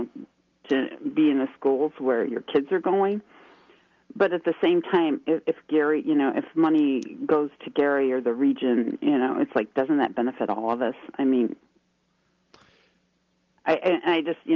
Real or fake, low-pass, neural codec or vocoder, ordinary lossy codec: real; 7.2 kHz; none; Opus, 32 kbps